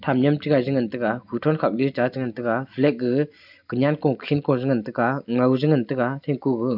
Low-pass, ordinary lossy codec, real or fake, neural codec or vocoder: 5.4 kHz; none; real; none